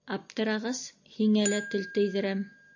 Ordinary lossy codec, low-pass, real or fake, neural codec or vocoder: AAC, 48 kbps; 7.2 kHz; real; none